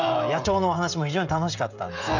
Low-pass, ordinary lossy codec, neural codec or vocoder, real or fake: 7.2 kHz; none; codec, 16 kHz, 16 kbps, FreqCodec, smaller model; fake